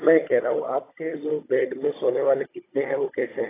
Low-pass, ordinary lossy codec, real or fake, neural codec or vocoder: 3.6 kHz; AAC, 16 kbps; fake; codec, 16 kHz, 16 kbps, FunCodec, trained on Chinese and English, 50 frames a second